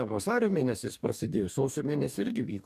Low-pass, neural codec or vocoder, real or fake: 14.4 kHz; codec, 44.1 kHz, 2.6 kbps, DAC; fake